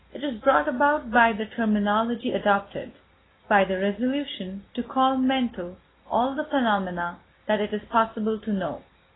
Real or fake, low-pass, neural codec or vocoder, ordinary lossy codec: real; 7.2 kHz; none; AAC, 16 kbps